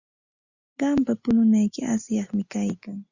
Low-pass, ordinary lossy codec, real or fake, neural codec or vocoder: 7.2 kHz; Opus, 64 kbps; real; none